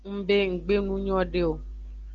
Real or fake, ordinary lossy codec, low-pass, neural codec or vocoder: real; Opus, 32 kbps; 7.2 kHz; none